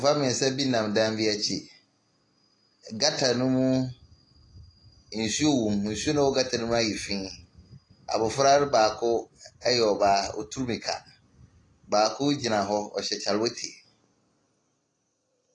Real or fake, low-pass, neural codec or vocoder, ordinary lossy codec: real; 10.8 kHz; none; AAC, 32 kbps